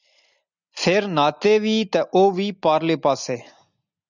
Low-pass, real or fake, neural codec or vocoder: 7.2 kHz; real; none